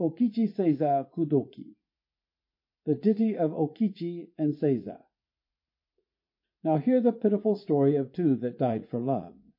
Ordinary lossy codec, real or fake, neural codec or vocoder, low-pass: MP3, 32 kbps; fake; vocoder, 44.1 kHz, 80 mel bands, Vocos; 5.4 kHz